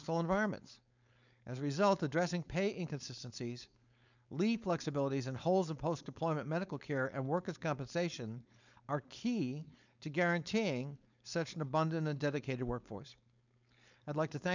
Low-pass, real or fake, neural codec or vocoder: 7.2 kHz; fake; codec, 16 kHz, 4.8 kbps, FACodec